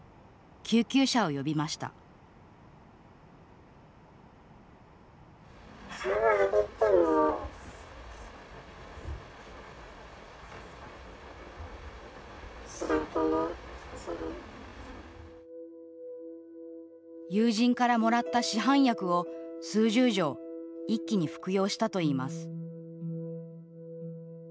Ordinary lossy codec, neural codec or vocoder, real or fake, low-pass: none; none; real; none